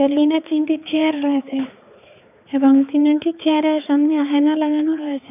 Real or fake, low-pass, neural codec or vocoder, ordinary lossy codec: fake; 3.6 kHz; codec, 16 kHz, 4 kbps, X-Codec, HuBERT features, trained on balanced general audio; none